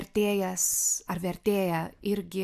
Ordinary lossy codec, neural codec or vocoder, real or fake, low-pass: AAC, 96 kbps; none; real; 14.4 kHz